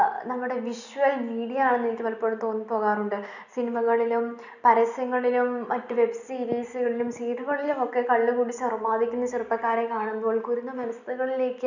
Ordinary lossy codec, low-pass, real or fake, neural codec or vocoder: none; 7.2 kHz; real; none